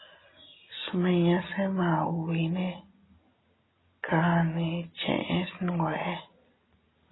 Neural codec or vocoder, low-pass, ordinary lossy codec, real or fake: none; 7.2 kHz; AAC, 16 kbps; real